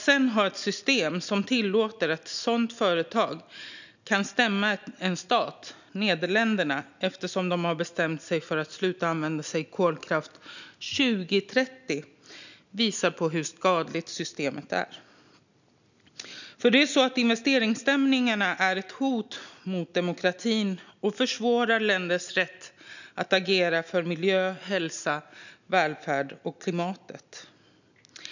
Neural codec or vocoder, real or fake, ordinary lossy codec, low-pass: none; real; none; 7.2 kHz